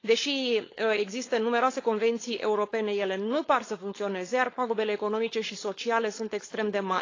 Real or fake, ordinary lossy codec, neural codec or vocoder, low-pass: fake; AAC, 32 kbps; codec, 16 kHz, 4.8 kbps, FACodec; 7.2 kHz